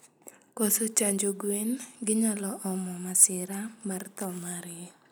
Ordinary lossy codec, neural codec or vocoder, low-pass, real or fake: none; none; none; real